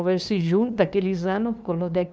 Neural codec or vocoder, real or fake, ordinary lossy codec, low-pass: codec, 16 kHz, 2 kbps, FunCodec, trained on LibriTTS, 25 frames a second; fake; none; none